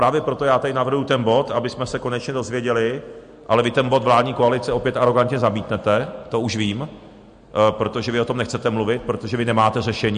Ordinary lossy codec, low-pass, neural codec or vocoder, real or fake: MP3, 48 kbps; 9.9 kHz; none; real